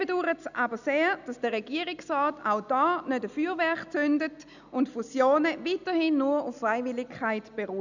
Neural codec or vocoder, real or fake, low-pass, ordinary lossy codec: none; real; 7.2 kHz; none